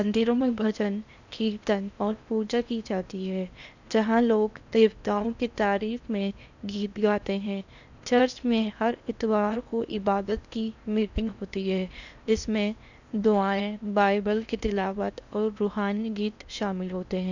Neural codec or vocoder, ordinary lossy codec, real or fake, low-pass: codec, 16 kHz in and 24 kHz out, 0.8 kbps, FocalCodec, streaming, 65536 codes; none; fake; 7.2 kHz